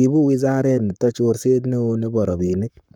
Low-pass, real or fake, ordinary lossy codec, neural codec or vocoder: 19.8 kHz; fake; none; codec, 44.1 kHz, 7.8 kbps, Pupu-Codec